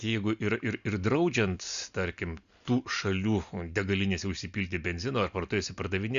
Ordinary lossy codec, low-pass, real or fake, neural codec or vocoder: Opus, 64 kbps; 7.2 kHz; real; none